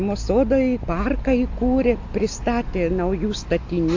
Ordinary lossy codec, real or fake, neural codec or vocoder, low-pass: AAC, 48 kbps; real; none; 7.2 kHz